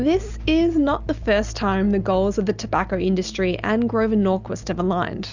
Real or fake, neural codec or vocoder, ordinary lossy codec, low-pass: real; none; Opus, 64 kbps; 7.2 kHz